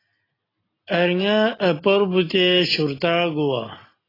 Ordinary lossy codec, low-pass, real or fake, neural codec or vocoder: AAC, 24 kbps; 5.4 kHz; real; none